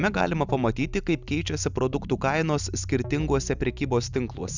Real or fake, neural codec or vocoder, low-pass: real; none; 7.2 kHz